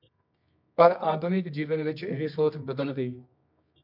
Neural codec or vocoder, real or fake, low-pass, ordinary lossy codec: codec, 24 kHz, 0.9 kbps, WavTokenizer, medium music audio release; fake; 5.4 kHz; MP3, 48 kbps